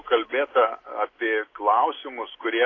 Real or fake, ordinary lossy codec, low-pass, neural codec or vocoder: real; AAC, 32 kbps; 7.2 kHz; none